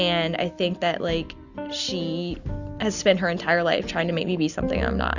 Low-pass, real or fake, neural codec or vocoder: 7.2 kHz; real; none